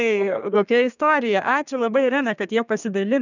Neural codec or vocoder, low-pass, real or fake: codec, 32 kHz, 1.9 kbps, SNAC; 7.2 kHz; fake